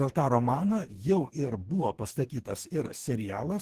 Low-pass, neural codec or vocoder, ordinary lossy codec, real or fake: 14.4 kHz; codec, 44.1 kHz, 2.6 kbps, DAC; Opus, 16 kbps; fake